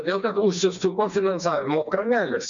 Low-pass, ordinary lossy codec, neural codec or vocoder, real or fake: 7.2 kHz; MP3, 64 kbps; codec, 16 kHz, 2 kbps, FreqCodec, smaller model; fake